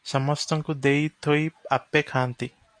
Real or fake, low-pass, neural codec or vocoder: real; 9.9 kHz; none